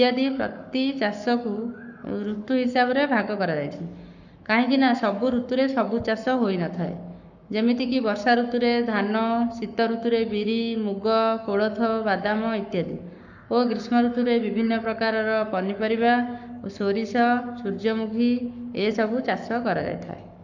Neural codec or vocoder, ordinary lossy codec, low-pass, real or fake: codec, 44.1 kHz, 7.8 kbps, Pupu-Codec; none; 7.2 kHz; fake